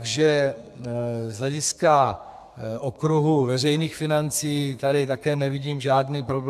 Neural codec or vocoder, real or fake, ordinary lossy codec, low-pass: codec, 32 kHz, 1.9 kbps, SNAC; fake; MP3, 96 kbps; 14.4 kHz